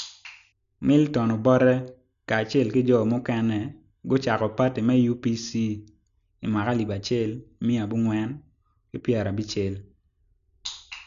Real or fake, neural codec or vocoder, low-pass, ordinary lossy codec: real; none; 7.2 kHz; none